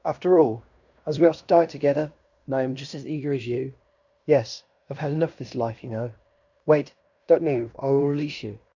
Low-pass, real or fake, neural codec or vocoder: 7.2 kHz; fake; codec, 16 kHz in and 24 kHz out, 0.9 kbps, LongCat-Audio-Codec, fine tuned four codebook decoder